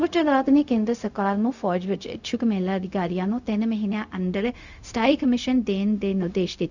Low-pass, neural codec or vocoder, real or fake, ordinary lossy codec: 7.2 kHz; codec, 16 kHz, 0.4 kbps, LongCat-Audio-Codec; fake; none